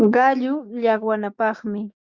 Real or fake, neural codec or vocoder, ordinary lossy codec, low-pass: fake; codec, 16 kHz, 6 kbps, DAC; Opus, 64 kbps; 7.2 kHz